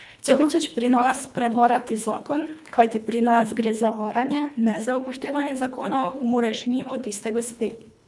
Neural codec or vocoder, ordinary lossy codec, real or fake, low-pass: codec, 24 kHz, 1.5 kbps, HILCodec; none; fake; none